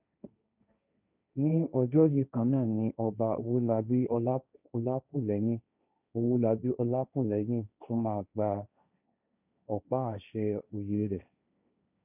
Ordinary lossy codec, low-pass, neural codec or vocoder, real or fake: none; 3.6 kHz; codec, 16 kHz, 1.1 kbps, Voila-Tokenizer; fake